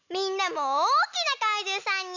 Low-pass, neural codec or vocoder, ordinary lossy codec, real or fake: 7.2 kHz; none; none; real